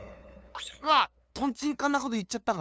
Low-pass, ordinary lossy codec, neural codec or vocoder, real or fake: none; none; codec, 16 kHz, 2 kbps, FunCodec, trained on LibriTTS, 25 frames a second; fake